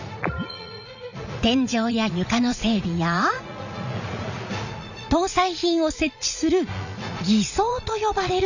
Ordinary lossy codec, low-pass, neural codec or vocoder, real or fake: none; 7.2 kHz; vocoder, 44.1 kHz, 80 mel bands, Vocos; fake